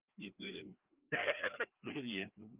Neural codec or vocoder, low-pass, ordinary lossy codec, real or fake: codec, 16 kHz, 2 kbps, FreqCodec, larger model; 3.6 kHz; Opus, 32 kbps; fake